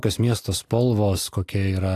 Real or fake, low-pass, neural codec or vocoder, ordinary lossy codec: real; 14.4 kHz; none; AAC, 64 kbps